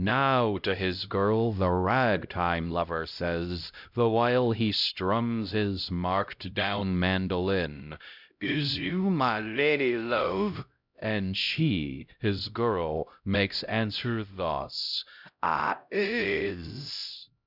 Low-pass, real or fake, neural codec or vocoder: 5.4 kHz; fake; codec, 16 kHz, 0.5 kbps, X-Codec, HuBERT features, trained on LibriSpeech